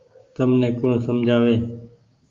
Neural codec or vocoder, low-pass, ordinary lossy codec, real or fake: codec, 16 kHz, 6 kbps, DAC; 7.2 kHz; Opus, 32 kbps; fake